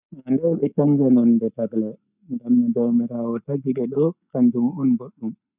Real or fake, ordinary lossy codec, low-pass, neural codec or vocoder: real; none; 3.6 kHz; none